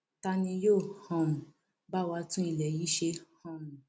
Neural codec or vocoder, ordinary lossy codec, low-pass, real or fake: none; none; none; real